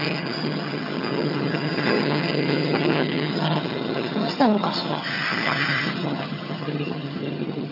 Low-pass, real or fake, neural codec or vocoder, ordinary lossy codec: 5.4 kHz; fake; vocoder, 22.05 kHz, 80 mel bands, HiFi-GAN; none